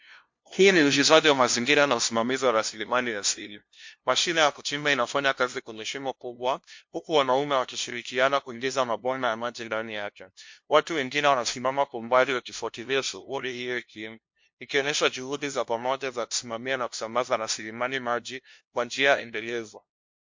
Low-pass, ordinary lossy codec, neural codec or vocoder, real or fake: 7.2 kHz; MP3, 48 kbps; codec, 16 kHz, 0.5 kbps, FunCodec, trained on LibriTTS, 25 frames a second; fake